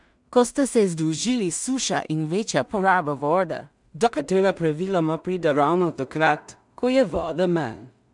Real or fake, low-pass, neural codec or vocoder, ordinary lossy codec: fake; 10.8 kHz; codec, 16 kHz in and 24 kHz out, 0.4 kbps, LongCat-Audio-Codec, two codebook decoder; none